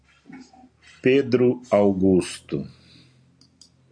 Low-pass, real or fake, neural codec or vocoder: 9.9 kHz; real; none